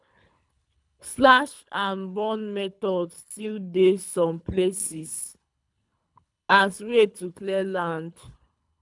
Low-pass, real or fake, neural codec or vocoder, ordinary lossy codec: 10.8 kHz; fake; codec, 24 kHz, 3 kbps, HILCodec; none